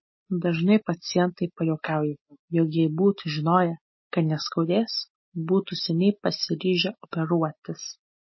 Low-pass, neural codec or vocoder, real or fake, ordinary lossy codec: 7.2 kHz; none; real; MP3, 24 kbps